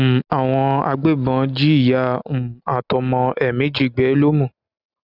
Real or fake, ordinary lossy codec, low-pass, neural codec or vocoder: real; none; 5.4 kHz; none